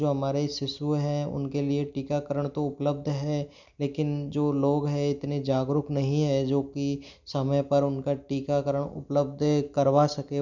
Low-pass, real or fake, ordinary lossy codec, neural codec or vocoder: 7.2 kHz; real; none; none